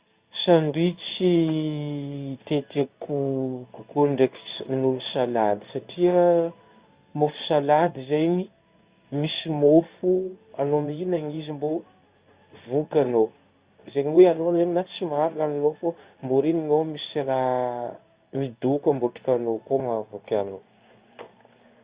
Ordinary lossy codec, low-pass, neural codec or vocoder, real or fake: Opus, 64 kbps; 3.6 kHz; codec, 16 kHz in and 24 kHz out, 1 kbps, XY-Tokenizer; fake